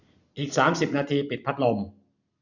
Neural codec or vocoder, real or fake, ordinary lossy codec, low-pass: none; real; none; 7.2 kHz